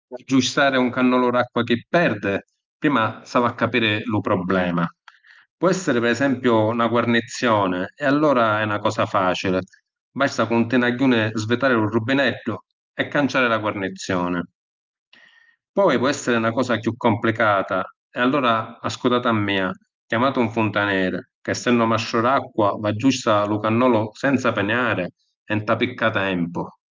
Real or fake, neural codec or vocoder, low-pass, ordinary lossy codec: real; none; 7.2 kHz; Opus, 24 kbps